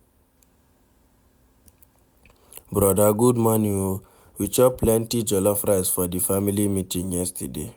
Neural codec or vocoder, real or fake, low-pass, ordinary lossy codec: none; real; none; none